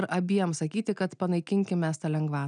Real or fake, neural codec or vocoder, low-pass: real; none; 9.9 kHz